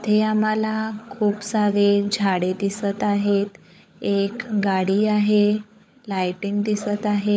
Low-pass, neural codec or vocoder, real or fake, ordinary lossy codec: none; codec, 16 kHz, 16 kbps, FunCodec, trained on LibriTTS, 50 frames a second; fake; none